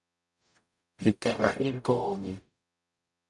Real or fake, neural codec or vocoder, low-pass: fake; codec, 44.1 kHz, 0.9 kbps, DAC; 10.8 kHz